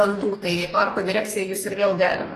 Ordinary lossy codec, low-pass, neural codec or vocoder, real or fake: AAC, 48 kbps; 14.4 kHz; codec, 44.1 kHz, 2.6 kbps, DAC; fake